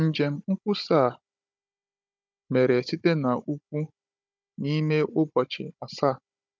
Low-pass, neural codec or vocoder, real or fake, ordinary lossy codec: none; codec, 16 kHz, 16 kbps, FunCodec, trained on Chinese and English, 50 frames a second; fake; none